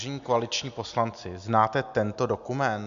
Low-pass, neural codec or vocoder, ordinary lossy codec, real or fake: 7.2 kHz; none; MP3, 64 kbps; real